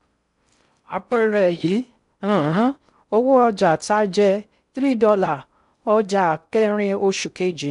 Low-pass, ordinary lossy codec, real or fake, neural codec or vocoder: 10.8 kHz; none; fake; codec, 16 kHz in and 24 kHz out, 0.6 kbps, FocalCodec, streaming, 4096 codes